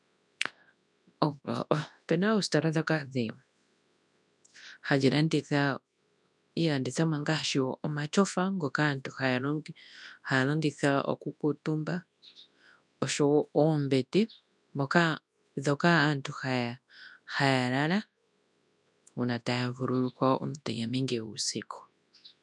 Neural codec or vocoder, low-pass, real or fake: codec, 24 kHz, 0.9 kbps, WavTokenizer, large speech release; 10.8 kHz; fake